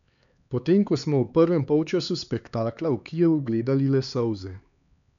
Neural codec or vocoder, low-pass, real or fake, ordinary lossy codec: codec, 16 kHz, 4 kbps, X-Codec, HuBERT features, trained on LibriSpeech; 7.2 kHz; fake; none